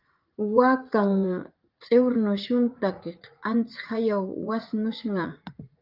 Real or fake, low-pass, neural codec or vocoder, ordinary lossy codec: fake; 5.4 kHz; vocoder, 44.1 kHz, 80 mel bands, Vocos; Opus, 24 kbps